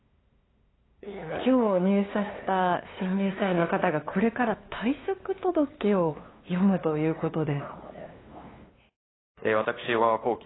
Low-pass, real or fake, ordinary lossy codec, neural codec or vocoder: 7.2 kHz; fake; AAC, 16 kbps; codec, 16 kHz, 2 kbps, FunCodec, trained on LibriTTS, 25 frames a second